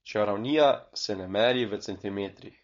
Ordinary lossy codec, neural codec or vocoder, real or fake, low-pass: MP3, 48 kbps; codec, 16 kHz, 4.8 kbps, FACodec; fake; 7.2 kHz